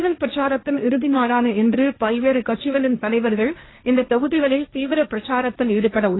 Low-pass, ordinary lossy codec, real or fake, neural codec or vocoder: 7.2 kHz; AAC, 16 kbps; fake; codec, 16 kHz, 1.1 kbps, Voila-Tokenizer